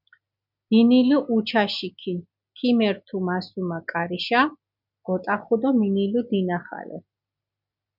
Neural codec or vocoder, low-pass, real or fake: none; 5.4 kHz; real